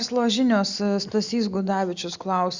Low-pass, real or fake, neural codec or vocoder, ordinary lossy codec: 7.2 kHz; real; none; Opus, 64 kbps